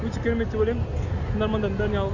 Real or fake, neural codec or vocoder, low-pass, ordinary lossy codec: real; none; 7.2 kHz; none